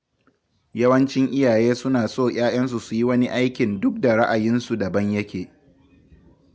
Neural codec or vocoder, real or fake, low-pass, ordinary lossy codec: none; real; none; none